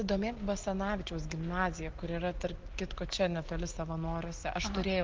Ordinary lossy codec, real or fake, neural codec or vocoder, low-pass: Opus, 16 kbps; real; none; 7.2 kHz